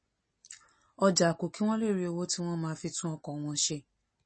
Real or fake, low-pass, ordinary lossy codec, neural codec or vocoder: real; 9.9 kHz; MP3, 32 kbps; none